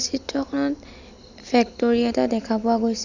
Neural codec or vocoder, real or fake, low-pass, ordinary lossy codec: none; real; 7.2 kHz; none